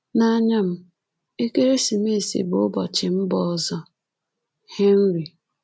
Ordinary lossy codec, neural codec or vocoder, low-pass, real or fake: none; none; none; real